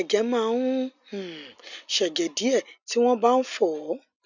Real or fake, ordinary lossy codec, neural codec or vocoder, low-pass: real; none; none; 7.2 kHz